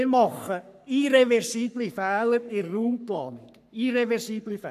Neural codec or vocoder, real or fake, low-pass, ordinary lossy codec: codec, 44.1 kHz, 3.4 kbps, Pupu-Codec; fake; 14.4 kHz; none